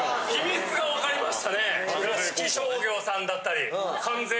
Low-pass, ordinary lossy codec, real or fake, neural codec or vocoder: none; none; real; none